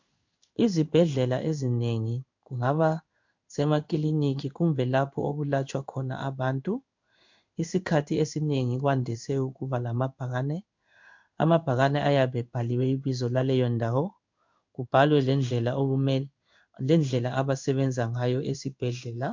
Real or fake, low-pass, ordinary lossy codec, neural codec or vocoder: fake; 7.2 kHz; MP3, 64 kbps; codec, 16 kHz in and 24 kHz out, 1 kbps, XY-Tokenizer